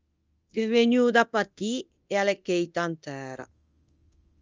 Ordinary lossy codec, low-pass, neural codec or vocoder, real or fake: Opus, 24 kbps; 7.2 kHz; codec, 24 kHz, 0.9 kbps, DualCodec; fake